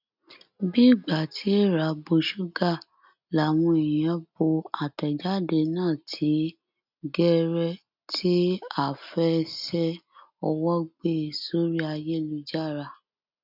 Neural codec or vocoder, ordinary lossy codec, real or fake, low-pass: none; none; real; 5.4 kHz